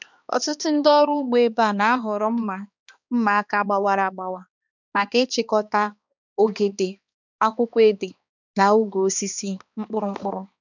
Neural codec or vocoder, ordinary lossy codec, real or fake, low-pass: codec, 16 kHz, 2 kbps, X-Codec, HuBERT features, trained on balanced general audio; none; fake; 7.2 kHz